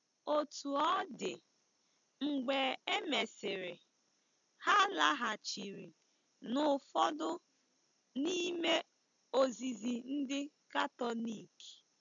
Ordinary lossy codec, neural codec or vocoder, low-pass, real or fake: MP3, 64 kbps; none; 7.2 kHz; real